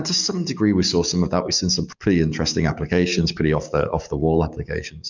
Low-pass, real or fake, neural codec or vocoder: 7.2 kHz; fake; codec, 44.1 kHz, 7.8 kbps, DAC